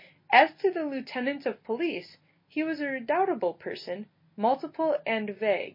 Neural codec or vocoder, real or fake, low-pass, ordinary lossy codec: none; real; 5.4 kHz; MP3, 24 kbps